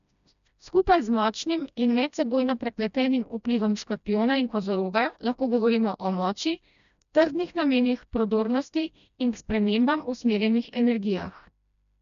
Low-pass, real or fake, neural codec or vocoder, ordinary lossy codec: 7.2 kHz; fake; codec, 16 kHz, 1 kbps, FreqCodec, smaller model; none